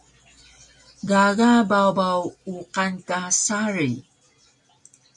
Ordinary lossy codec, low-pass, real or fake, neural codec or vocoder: MP3, 64 kbps; 10.8 kHz; real; none